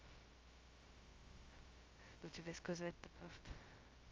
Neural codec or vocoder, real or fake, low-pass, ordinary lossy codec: codec, 16 kHz, 0.2 kbps, FocalCodec; fake; 7.2 kHz; Opus, 32 kbps